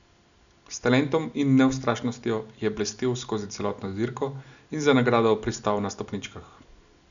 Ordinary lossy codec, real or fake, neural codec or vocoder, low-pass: none; real; none; 7.2 kHz